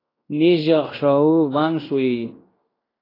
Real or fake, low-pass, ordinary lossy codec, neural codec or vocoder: fake; 5.4 kHz; AAC, 24 kbps; codec, 16 kHz in and 24 kHz out, 0.9 kbps, LongCat-Audio-Codec, fine tuned four codebook decoder